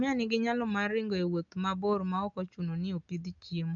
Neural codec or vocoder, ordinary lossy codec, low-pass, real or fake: none; none; 7.2 kHz; real